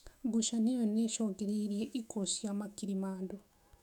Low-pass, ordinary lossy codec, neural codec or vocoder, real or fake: 19.8 kHz; none; autoencoder, 48 kHz, 128 numbers a frame, DAC-VAE, trained on Japanese speech; fake